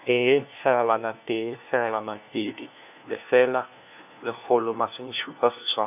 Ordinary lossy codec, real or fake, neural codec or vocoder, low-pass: none; fake; codec, 16 kHz, 1 kbps, FunCodec, trained on LibriTTS, 50 frames a second; 3.6 kHz